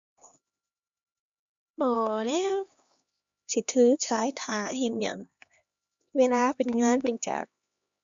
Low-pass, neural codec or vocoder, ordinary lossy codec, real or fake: 7.2 kHz; codec, 16 kHz, 2 kbps, X-Codec, HuBERT features, trained on LibriSpeech; Opus, 64 kbps; fake